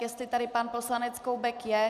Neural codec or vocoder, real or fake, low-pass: none; real; 14.4 kHz